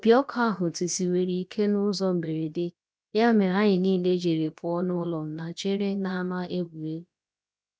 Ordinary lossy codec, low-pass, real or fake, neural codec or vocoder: none; none; fake; codec, 16 kHz, about 1 kbps, DyCAST, with the encoder's durations